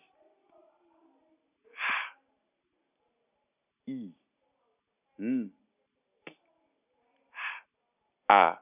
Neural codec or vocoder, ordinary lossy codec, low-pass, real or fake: none; none; 3.6 kHz; real